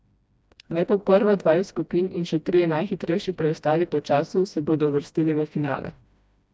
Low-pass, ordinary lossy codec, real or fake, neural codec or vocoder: none; none; fake; codec, 16 kHz, 1 kbps, FreqCodec, smaller model